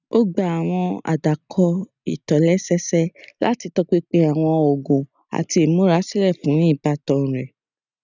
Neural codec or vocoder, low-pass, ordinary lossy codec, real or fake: none; 7.2 kHz; none; real